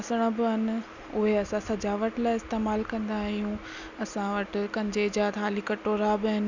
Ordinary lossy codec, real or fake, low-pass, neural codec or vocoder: none; real; 7.2 kHz; none